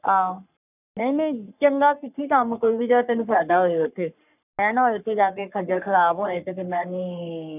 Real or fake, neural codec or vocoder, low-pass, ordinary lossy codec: fake; codec, 44.1 kHz, 3.4 kbps, Pupu-Codec; 3.6 kHz; none